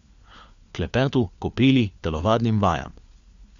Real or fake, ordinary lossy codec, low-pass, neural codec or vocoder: fake; Opus, 64 kbps; 7.2 kHz; codec, 16 kHz, 4 kbps, FunCodec, trained on LibriTTS, 50 frames a second